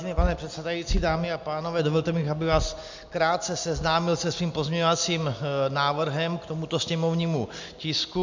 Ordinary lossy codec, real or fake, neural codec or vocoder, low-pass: MP3, 64 kbps; real; none; 7.2 kHz